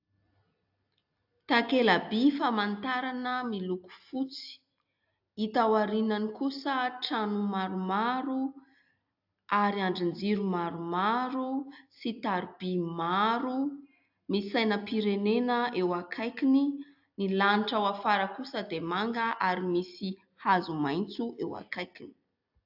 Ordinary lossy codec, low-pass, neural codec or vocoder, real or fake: AAC, 48 kbps; 5.4 kHz; none; real